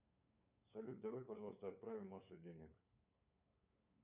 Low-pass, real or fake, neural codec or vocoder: 3.6 kHz; fake; codec, 16 kHz, 8 kbps, FunCodec, trained on LibriTTS, 25 frames a second